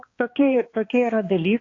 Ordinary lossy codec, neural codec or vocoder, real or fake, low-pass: AAC, 32 kbps; codec, 16 kHz, 2 kbps, X-Codec, HuBERT features, trained on balanced general audio; fake; 7.2 kHz